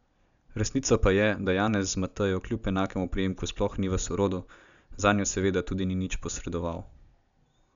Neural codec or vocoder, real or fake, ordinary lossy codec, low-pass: none; real; none; 7.2 kHz